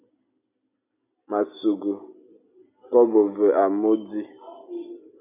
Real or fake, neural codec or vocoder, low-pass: real; none; 3.6 kHz